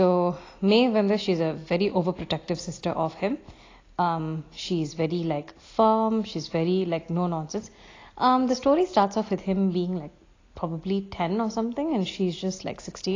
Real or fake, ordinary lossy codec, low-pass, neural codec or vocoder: real; AAC, 32 kbps; 7.2 kHz; none